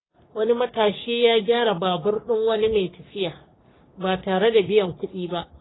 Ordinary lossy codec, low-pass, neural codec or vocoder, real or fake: AAC, 16 kbps; 7.2 kHz; codec, 44.1 kHz, 3.4 kbps, Pupu-Codec; fake